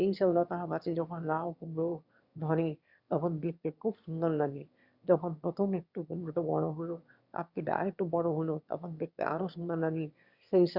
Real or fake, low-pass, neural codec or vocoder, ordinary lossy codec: fake; 5.4 kHz; autoencoder, 22.05 kHz, a latent of 192 numbers a frame, VITS, trained on one speaker; Opus, 64 kbps